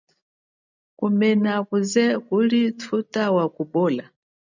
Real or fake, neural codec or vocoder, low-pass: real; none; 7.2 kHz